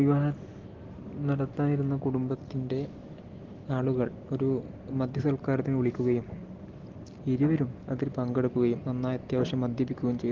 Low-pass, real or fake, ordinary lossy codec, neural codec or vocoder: 7.2 kHz; real; Opus, 16 kbps; none